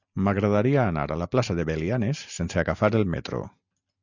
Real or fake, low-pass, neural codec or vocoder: real; 7.2 kHz; none